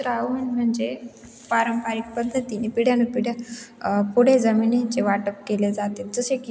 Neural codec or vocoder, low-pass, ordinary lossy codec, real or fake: none; none; none; real